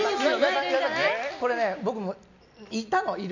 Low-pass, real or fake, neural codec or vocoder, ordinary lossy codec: 7.2 kHz; real; none; none